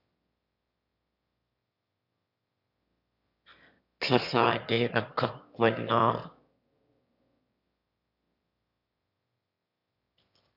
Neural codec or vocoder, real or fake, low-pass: autoencoder, 22.05 kHz, a latent of 192 numbers a frame, VITS, trained on one speaker; fake; 5.4 kHz